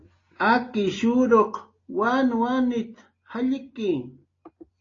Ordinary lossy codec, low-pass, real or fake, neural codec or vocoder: AAC, 32 kbps; 7.2 kHz; real; none